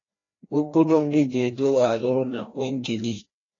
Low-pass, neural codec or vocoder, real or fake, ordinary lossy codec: 7.2 kHz; codec, 16 kHz, 1 kbps, FreqCodec, larger model; fake; AAC, 32 kbps